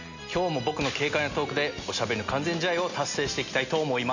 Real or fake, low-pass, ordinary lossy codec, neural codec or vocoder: real; 7.2 kHz; none; none